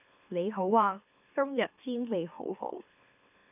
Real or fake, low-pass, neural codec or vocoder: fake; 3.6 kHz; autoencoder, 44.1 kHz, a latent of 192 numbers a frame, MeloTTS